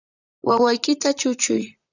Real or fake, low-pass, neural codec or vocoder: fake; 7.2 kHz; vocoder, 44.1 kHz, 128 mel bands, Pupu-Vocoder